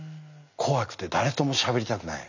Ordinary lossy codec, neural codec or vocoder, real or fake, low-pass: none; none; real; 7.2 kHz